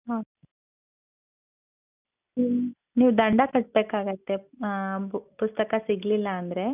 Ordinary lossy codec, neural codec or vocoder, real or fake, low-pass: none; none; real; 3.6 kHz